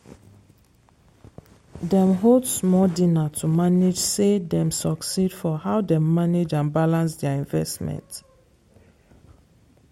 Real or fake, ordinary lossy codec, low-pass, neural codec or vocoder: real; MP3, 64 kbps; 19.8 kHz; none